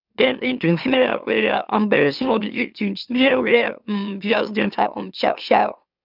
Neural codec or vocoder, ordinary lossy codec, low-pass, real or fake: autoencoder, 44.1 kHz, a latent of 192 numbers a frame, MeloTTS; none; 5.4 kHz; fake